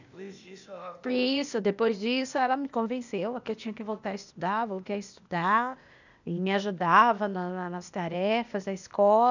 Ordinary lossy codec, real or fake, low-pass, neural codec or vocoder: none; fake; 7.2 kHz; codec, 16 kHz, 0.8 kbps, ZipCodec